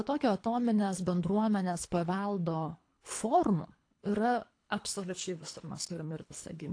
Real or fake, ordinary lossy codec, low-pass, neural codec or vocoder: fake; AAC, 48 kbps; 9.9 kHz; codec, 24 kHz, 3 kbps, HILCodec